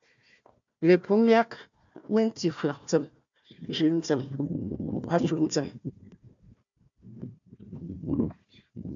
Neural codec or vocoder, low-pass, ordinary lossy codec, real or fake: codec, 16 kHz, 1 kbps, FunCodec, trained on Chinese and English, 50 frames a second; 7.2 kHz; MP3, 64 kbps; fake